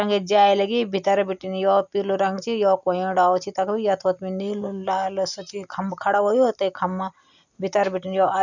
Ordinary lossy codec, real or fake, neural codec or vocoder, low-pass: none; real; none; 7.2 kHz